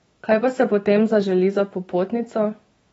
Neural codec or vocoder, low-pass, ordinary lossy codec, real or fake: autoencoder, 48 kHz, 128 numbers a frame, DAC-VAE, trained on Japanese speech; 19.8 kHz; AAC, 24 kbps; fake